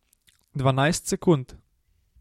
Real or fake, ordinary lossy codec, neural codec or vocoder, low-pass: real; MP3, 64 kbps; none; 19.8 kHz